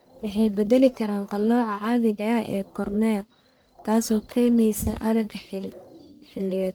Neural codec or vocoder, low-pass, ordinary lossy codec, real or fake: codec, 44.1 kHz, 1.7 kbps, Pupu-Codec; none; none; fake